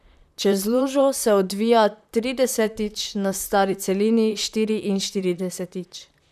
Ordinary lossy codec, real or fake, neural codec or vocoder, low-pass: none; fake; vocoder, 44.1 kHz, 128 mel bands, Pupu-Vocoder; 14.4 kHz